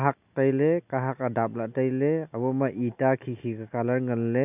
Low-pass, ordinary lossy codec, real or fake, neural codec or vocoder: 3.6 kHz; none; real; none